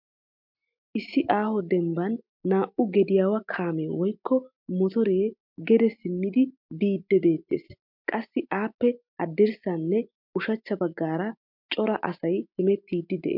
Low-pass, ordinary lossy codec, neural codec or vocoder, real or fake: 5.4 kHz; MP3, 48 kbps; none; real